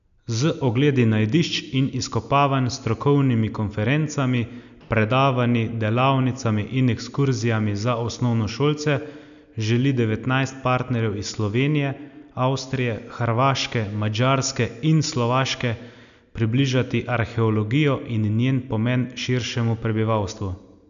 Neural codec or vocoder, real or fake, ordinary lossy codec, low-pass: none; real; none; 7.2 kHz